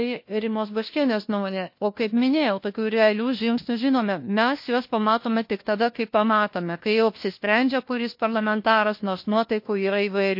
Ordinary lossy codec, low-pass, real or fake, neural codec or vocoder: MP3, 32 kbps; 5.4 kHz; fake; codec, 16 kHz, 1 kbps, FunCodec, trained on LibriTTS, 50 frames a second